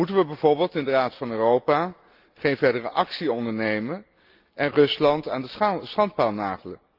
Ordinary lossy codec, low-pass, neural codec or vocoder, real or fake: Opus, 24 kbps; 5.4 kHz; none; real